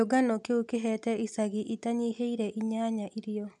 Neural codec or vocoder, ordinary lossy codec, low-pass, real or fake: none; MP3, 96 kbps; 10.8 kHz; real